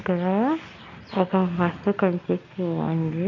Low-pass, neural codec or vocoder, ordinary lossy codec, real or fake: 7.2 kHz; none; none; real